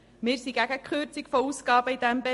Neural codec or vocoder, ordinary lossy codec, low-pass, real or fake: none; MP3, 48 kbps; 14.4 kHz; real